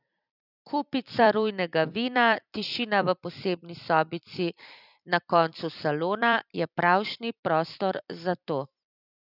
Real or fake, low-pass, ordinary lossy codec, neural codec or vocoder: fake; 5.4 kHz; none; vocoder, 44.1 kHz, 128 mel bands every 256 samples, BigVGAN v2